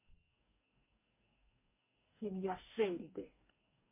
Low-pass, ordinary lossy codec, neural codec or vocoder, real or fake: 3.6 kHz; MP3, 24 kbps; codec, 44.1 kHz, 2.6 kbps, DAC; fake